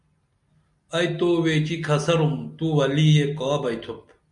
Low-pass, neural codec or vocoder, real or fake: 10.8 kHz; none; real